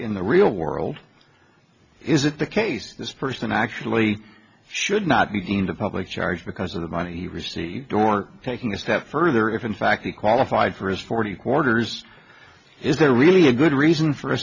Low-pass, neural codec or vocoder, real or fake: 7.2 kHz; none; real